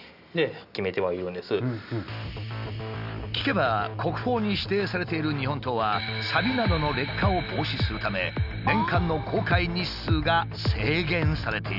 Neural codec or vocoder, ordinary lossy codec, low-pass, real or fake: none; none; 5.4 kHz; real